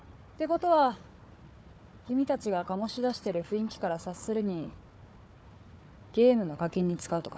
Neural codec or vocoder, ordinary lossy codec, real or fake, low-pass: codec, 16 kHz, 4 kbps, FunCodec, trained on Chinese and English, 50 frames a second; none; fake; none